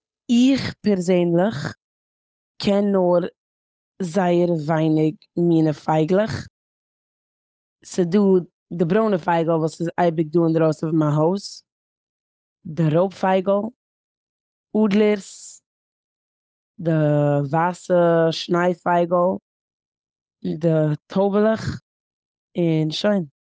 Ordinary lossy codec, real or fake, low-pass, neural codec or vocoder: none; fake; none; codec, 16 kHz, 8 kbps, FunCodec, trained on Chinese and English, 25 frames a second